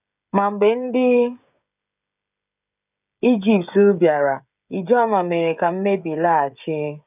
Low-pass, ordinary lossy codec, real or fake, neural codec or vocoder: 3.6 kHz; none; fake; codec, 16 kHz, 16 kbps, FreqCodec, smaller model